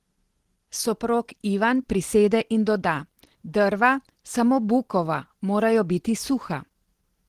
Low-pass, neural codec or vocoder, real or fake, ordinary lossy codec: 14.4 kHz; none; real; Opus, 16 kbps